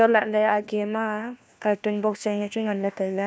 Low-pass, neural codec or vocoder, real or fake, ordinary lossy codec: none; codec, 16 kHz, 1 kbps, FunCodec, trained on LibriTTS, 50 frames a second; fake; none